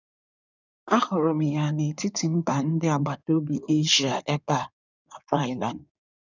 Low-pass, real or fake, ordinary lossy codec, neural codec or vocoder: 7.2 kHz; fake; none; codec, 16 kHz in and 24 kHz out, 2.2 kbps, FireRedTTS-2 codec